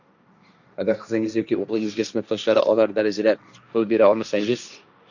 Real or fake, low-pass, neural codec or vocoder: fake; 7.2 kHz; codec, 16 kHz, 1.1 kbps, Voila-Tokenizer